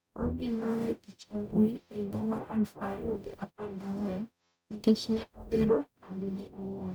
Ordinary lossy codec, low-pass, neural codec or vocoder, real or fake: none; none; codec, 44.1 kHz, 0.9 kbps, DAC; fake